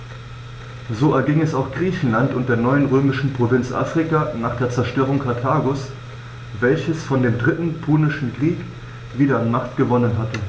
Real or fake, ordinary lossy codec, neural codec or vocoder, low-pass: real; none; none; none